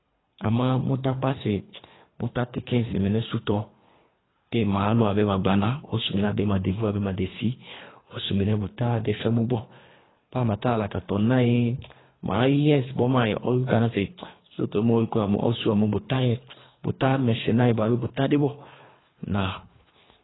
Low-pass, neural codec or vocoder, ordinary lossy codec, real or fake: 7.2 kHz; codec, 24 kHz, 3 kbps, HILCodec; AAC, 16 kbps; fake